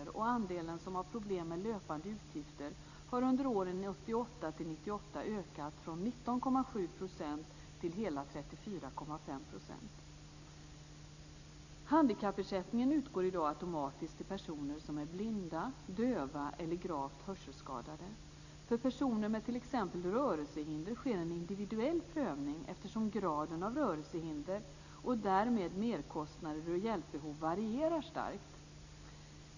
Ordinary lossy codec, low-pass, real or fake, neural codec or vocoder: none; 7.2 kHz; real; none